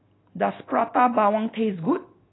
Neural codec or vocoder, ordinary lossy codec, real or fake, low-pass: none; AAC, 16 kbps; real; 7.2 kHz